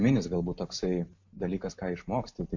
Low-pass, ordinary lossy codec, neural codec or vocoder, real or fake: 7.2 kHz; MP3, 48 kbps; none; real